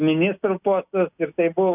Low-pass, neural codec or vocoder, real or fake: 3.6 kHz; none; real